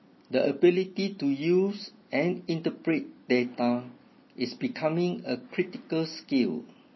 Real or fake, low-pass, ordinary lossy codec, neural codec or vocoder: real; 7.2 kHz; MP3, 24 kbps; none